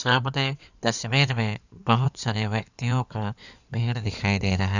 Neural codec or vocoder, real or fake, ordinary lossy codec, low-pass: codec, 16 kHz in and 24 kHz out, 2.2 kbps, FireRedTTS-2 codec; fake; none; 7.2 kHz